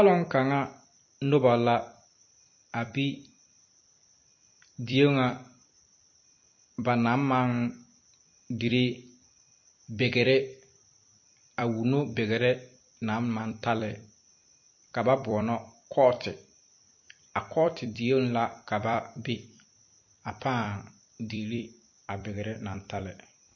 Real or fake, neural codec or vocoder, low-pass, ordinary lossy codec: fake; vocoder, 44.1 kHz, 128 mel bands every 256 samples, BigVGAN v2; 7.2 kHz; MP3, 32 kbps